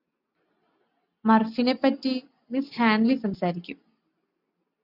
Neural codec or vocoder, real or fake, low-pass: none; real; 5.4 kHz